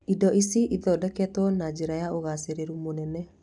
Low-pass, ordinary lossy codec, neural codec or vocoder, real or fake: 10.8 kHz; none; none; real